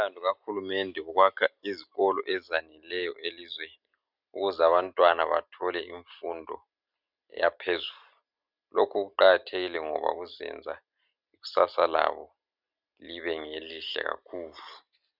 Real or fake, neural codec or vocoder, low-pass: real; none; 5.4 kHz